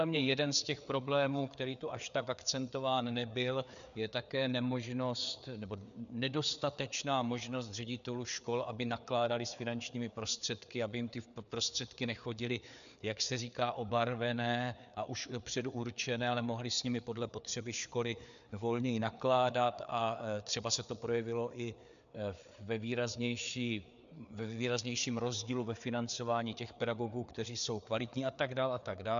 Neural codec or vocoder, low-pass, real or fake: codec, 16 kHz, 4 kbps, FreqCodec, larger model; 7.2 kHz; fake